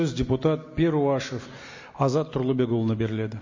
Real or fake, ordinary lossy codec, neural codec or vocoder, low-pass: real; MP3, 32 kbps; none; 7.2 kHz